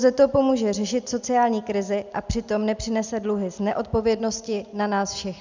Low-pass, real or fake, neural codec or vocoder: 7.2 kHz; real; none